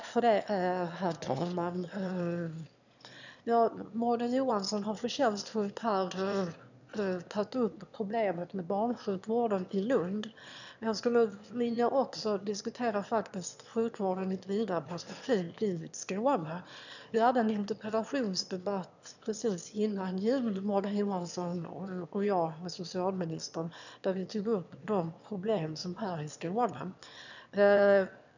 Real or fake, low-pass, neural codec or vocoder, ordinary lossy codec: fake; 7.2 kHz; autoencoder, 22.05 kHz, a latent of 192 numbers a frame, VITS, trained on one speaker; none